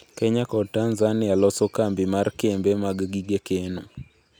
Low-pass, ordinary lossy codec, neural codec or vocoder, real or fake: none; none; none; real